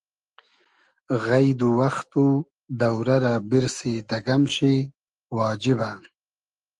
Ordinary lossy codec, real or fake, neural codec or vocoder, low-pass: Opus, 24 kbps; fake; autoencoder, 48 kHz, 128 numbers a frame, DAC-VAE, trained on Japanese speech; 10.8 kHz